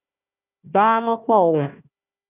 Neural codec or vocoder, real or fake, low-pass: codec, 16 kHz, 1 kbps, FunCodec, trained on Chinese and English, 50 frames a second; fake; 3.6 kHz